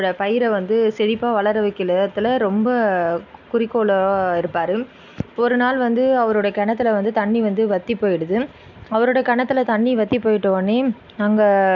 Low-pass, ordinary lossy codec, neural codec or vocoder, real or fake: 7.2 kHz; none; none; real